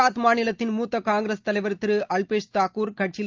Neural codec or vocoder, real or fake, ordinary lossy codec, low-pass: none; real; Opus, 24 kbps; 7.2 kHz